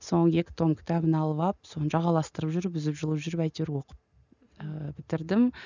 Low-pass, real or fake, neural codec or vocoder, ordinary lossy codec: 7.2 kHz; real; none; none